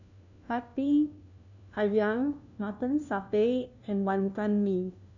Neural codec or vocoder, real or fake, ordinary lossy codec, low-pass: codec, 16 kHz, 1 kbps, FunCodec, trained on LibriTTS, 50 frames a second; fake; none; 7.2 kHz